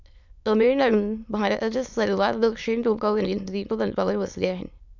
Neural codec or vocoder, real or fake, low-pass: autoencoder, 22.05 kHz, a latent of 192 numbers a frame, VITS, trained on many speakers; fake; 7.2 kHz